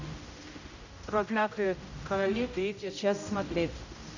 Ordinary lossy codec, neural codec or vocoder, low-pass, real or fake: none; codec, 16 kHz, 0.5 kbps, X-Codec, HuBERT features, trained on general audio; 7.2 kHz; fake